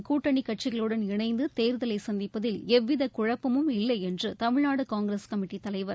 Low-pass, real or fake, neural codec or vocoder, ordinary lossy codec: none; real; none; none